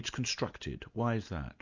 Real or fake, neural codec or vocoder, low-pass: real; none; 7.2 kHz